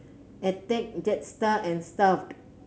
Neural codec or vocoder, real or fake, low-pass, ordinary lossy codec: none; real; none; none